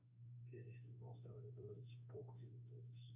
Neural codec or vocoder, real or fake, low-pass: autoencoder, 48 kHz, 32 numbers a frame, DAC-VAE, trained on Japanese speech; fake; 3.6 kHz